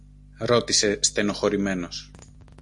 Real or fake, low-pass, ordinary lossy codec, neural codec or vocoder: real; 10.8 kHz; MP3, 48 kbps; none